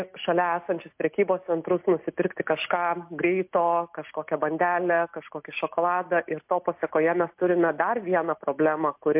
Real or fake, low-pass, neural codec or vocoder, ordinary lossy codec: real; 3.6 kHz; none; MP3, 32 kbps